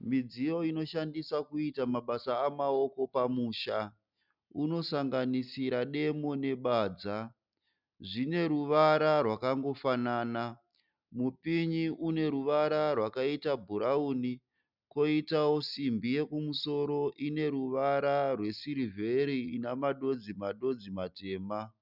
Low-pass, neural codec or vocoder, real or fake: 5.4 kHz; none; real